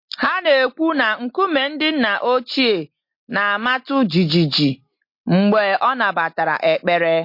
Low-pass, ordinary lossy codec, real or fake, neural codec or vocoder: 5.4 kHz; MP3, 32 kbps; real; none